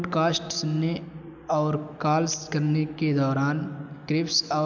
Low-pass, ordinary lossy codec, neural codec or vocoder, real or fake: 7.2 kHz; none; none; real